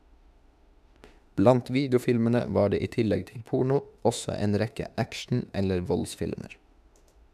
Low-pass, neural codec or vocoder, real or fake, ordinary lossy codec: 14.4 kHz; autoencoder, 48 kHz, 32 numbers a frame, DAC-VAE, trained on Japanese speech; fake; none